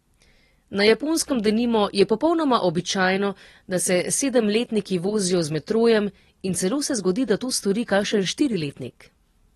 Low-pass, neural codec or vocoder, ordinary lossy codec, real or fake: 14.4 kHz; none; AAC, 32 kbps; real